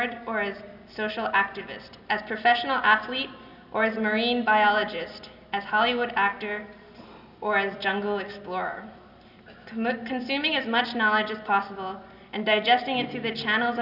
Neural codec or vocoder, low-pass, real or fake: none; 5.4 kHz; real